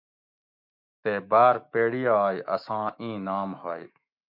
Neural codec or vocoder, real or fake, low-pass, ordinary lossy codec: autoencoder, 48 kHz, 128 numbers a frame, DAC-VAE, trained on Japanese speech; fake; 5.4 kHz; MP3, 48 kbps